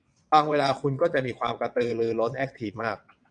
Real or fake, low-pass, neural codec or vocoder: fake; 9.9 kHz; vocoder, 22.05 kHz, 80 mel bands, WaveNeXt